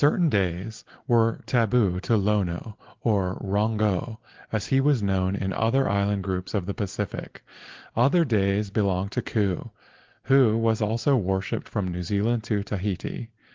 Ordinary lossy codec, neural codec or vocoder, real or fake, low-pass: Opus, 16 kbps; none; real; 7.2 kHz